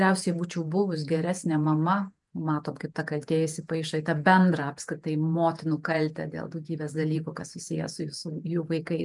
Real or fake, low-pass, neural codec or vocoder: fake; 10.8 kHz; vocoder, 48 kHz, 128 mel bands, Vocos